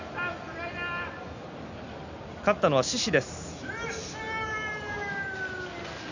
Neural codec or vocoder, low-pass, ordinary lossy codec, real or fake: none; 7.2 kHz; none; real